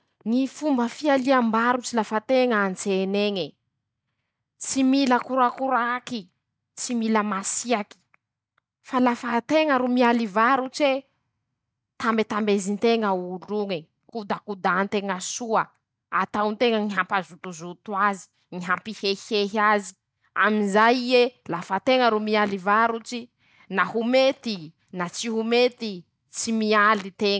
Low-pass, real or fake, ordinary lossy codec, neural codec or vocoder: none; real; none; none